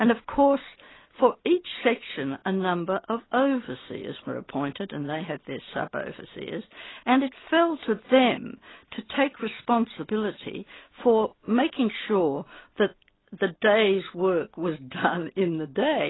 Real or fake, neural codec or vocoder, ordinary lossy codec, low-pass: real; none; AAC, 16 kbps; 7.2 kHz